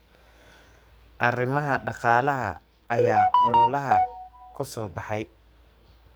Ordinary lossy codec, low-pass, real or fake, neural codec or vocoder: none; none; fake; codec, 44.1 kHz, 2.6 kbps, SNAC